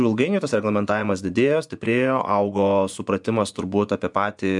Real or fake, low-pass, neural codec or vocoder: fake; 10.8 kHz; vocoder, 24 kHz, 100 mel bands, Vocos